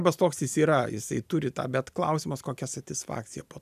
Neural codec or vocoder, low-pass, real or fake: none; 14.4 kHz; real